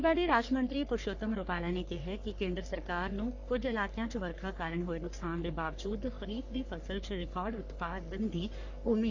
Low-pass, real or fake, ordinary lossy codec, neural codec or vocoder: 7.2 kHz; fake; none; codec, 44.1 kHz, 3.4 kbps, Pupu-Codec